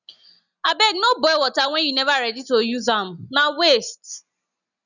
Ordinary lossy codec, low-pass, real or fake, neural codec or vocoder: none; 7.2 kHz; real; none